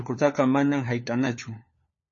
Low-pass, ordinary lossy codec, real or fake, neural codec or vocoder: 7.2 kHz; MP3, 32 kbps; fake; codec, 16 kHz, 4 kbps, FunCodec, trained on LibriTTS, 50 frames a second